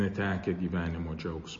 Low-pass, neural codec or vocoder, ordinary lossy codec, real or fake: 7.2 kHz; none; MP3, 32 kbps; real